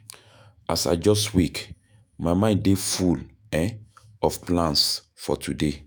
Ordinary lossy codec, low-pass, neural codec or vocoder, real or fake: none; none; autoencoder, 48 kHz, 128 numbers a frame, DAC-VAE, trained on Japanese speech; fake